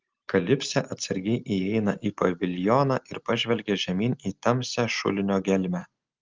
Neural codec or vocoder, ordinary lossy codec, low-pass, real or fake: none; Opus, 32 kbps; 7.2 kHz; real